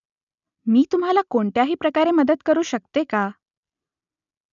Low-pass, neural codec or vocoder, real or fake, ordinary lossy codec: 7.2 kHz; none; real; none